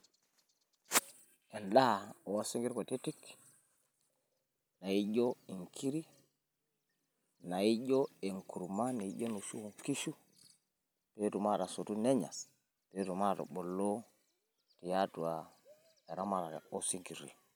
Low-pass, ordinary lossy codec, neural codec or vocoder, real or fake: none; none; none; real